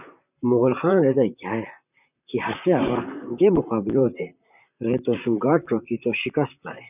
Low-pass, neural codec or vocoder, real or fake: 3.6 kHz; vocoder, 44.1 kHz, 128 mel bands, Pupu-Vocoder; fake